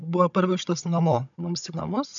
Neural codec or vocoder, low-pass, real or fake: codec, 16 kHz, 16 kbps, FunCodec, trained on Chinese and English, 50 frames a second; 7.2 kHz; fake